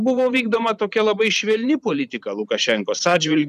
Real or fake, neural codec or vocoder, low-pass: fake; vocoder, 44.1 kHz, 128 mel bands every 512 samples, BigVGAN v2; 14.4 kHz